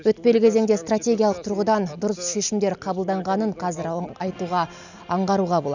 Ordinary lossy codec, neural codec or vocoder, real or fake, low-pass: none; none; real; 7.2 kHz